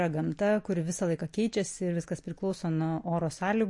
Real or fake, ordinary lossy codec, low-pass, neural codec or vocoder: real; MP3, 48 kbps; 10.8 kHz; none